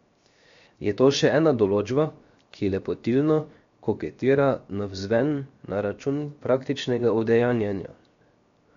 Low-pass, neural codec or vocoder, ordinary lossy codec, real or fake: 7.2 kHz; codec, 16 kHz, 0.7 kbps, FocalCodec; MP3, 48 kbps; fake